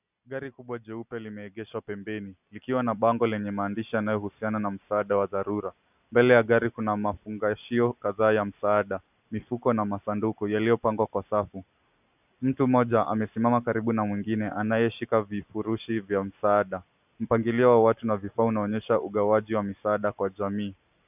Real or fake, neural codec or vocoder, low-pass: real; none; 3.6 kHz